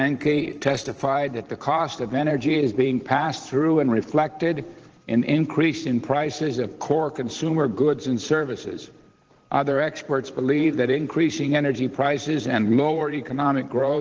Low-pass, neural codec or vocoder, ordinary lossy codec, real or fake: 7.2 kHz; vocoder, 44.1 kHz, 128 mel bands every 512 samples, BigVGAN v2; Opus, 16 kbps; fake